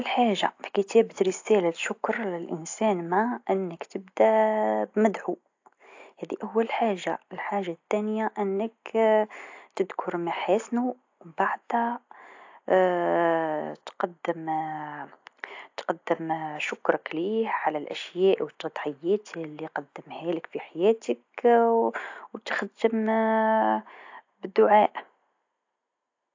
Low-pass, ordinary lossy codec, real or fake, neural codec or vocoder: 7.2 kHz; AAC, 48 kbps; real; none